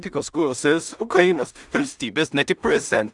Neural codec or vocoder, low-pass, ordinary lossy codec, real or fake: codec, 16 kHz in and 24 kHz out, 0.4 kbps, LongCat-Audio-Codec, two codebook decoder; 10.8 kHz; Opus, 64 kbps; fake